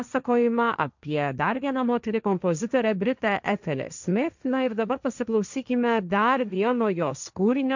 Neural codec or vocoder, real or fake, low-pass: codec, 16 kHz, 1.1 kbps, Voila-Tokenizer; fake; 7.2 kHz